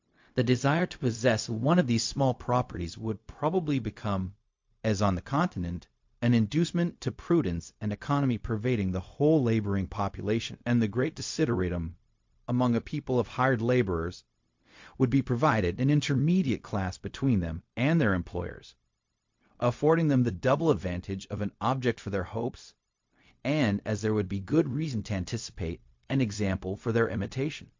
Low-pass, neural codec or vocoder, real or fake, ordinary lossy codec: 7.2 kHz; codec, 16 kHz, 0.4 kbps, LongCat-Audio-Codec; fake; MP3, 48 kbps